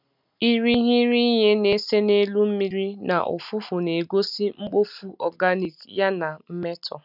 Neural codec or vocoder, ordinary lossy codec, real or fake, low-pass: none; none; real; 5.4 kHz